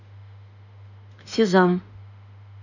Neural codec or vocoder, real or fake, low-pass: autoencoder, 48 kHz, 32 numbers a frame, DAC-VAE, trained on Japanese speech; fake; 7.2 kHz